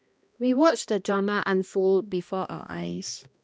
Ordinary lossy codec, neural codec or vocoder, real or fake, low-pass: none; codec, 16 kHz, 1 kbps, X-Codec, HuBERT features, trained on balanced general audio; fake; none